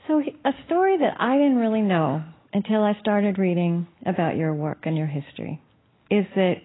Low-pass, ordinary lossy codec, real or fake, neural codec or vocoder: 7.2 kHz; AAC, 16 kbps; real; none